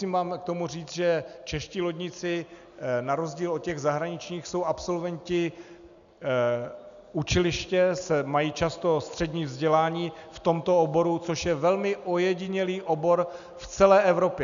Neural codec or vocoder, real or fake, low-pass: none; real; 7.2 kHz